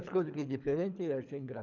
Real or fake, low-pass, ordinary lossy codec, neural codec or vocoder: fake; 7.2 kHz; none; codec, 24 kHz, 3 kbps, HILCodec